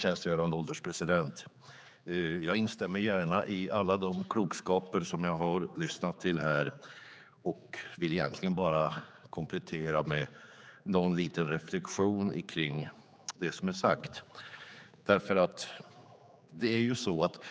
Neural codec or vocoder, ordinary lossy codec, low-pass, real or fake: codec, 16 kHz, 4 kbps, X-Codec, HuBERT features, trained on general audio; none; none; fake